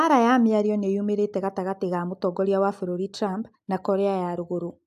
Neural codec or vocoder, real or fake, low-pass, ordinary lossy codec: none; real; 14.4 kHz; AAC, 96 kbps